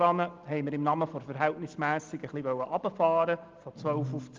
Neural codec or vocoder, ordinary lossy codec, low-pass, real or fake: none; Opus, 32 kbps; 7.2 kHz; real